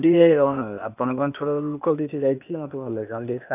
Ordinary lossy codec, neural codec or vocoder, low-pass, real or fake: none; codec, 16 kHz, 0.8 kbps, ZipCodec; 3.6 kHz; fake